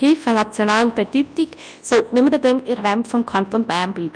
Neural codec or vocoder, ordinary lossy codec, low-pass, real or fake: codec, 24 kHz, 0.9 kbps, WavTokenizer, large speech release; none; 9.9 kHz; fake